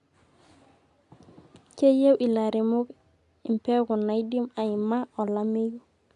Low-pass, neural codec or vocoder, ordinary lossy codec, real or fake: 10.8 kHz; none; none; real